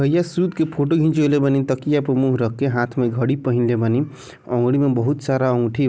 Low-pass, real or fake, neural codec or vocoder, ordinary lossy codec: none; real; none; none